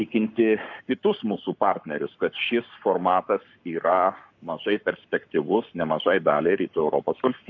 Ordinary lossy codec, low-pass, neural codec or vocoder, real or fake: MP3, 48 kbps; 7.2 kHz; codec, 44.1 kHz, 7.8 kbps, Pupu-Codec; fake